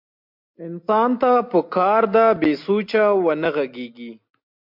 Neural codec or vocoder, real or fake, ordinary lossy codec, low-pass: none; real; MP3, 48 kbps; 5.4 kHz